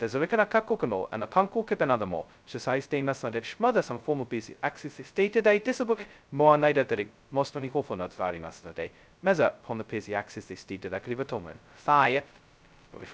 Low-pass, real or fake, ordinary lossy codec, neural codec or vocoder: none; fake; none; codec, 16 kHz, 0.2 kbps, FocalCodec